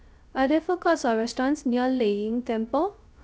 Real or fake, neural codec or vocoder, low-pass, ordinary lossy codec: fake; codec, 16 kHz, 0.3 kbps, FocalCodec; none; none